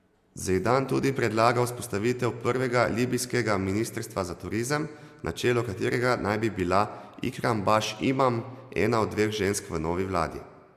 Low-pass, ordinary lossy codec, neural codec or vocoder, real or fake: 14.4 kHz; AAC, 96 kbps; none; real